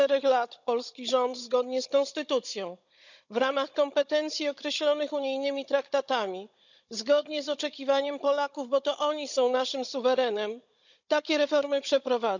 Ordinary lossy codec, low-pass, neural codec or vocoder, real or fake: none; 7.2 kHz; codec, 16 kHz, 16 kbps, FunCodec, trained on Chinese and English, 50 frames a second; fake